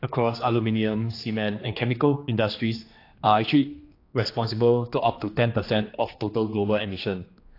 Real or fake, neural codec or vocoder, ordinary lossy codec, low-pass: fake; codec, 16 kHz, 2 kbps, X-Codec, HuBERT features, trained on general audio; AAC, 32 kbps; 5.4 kHz